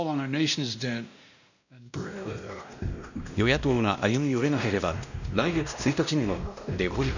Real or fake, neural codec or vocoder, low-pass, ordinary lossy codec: fake; codec, 16 kHz, 1 kbps, X-Codec, WavLM features, trained on Multilingual LibriSpeech; 7.2 kHz; none